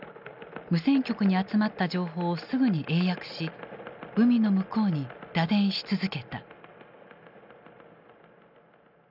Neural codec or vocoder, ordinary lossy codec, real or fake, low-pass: none; none; real; 5.4 kHz